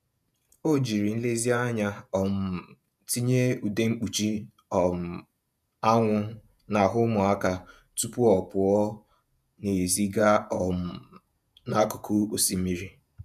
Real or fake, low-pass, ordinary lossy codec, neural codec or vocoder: fake; 14.4 kHz; none; vocoder, 48 kHz, 128 mel bands, Vocos